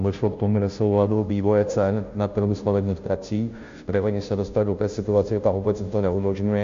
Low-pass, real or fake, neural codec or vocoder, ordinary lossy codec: 7.2 kHz; fake; codec, 16 kHz, 0.5 kbps, FunCodec, trained on Chinese and English, 25 frames a second; MP3, 48 kbps